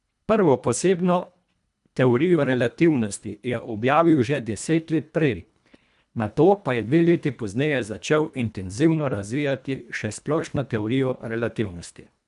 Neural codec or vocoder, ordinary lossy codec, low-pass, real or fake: codec, 24 kHz, 1.5 kbps, HILCodec; none; 10.8 kHz; fake